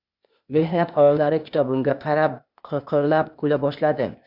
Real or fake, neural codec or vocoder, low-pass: fake; codec, 16 kHz, 0.8 kbps, ZipCodec; 5.4 kHz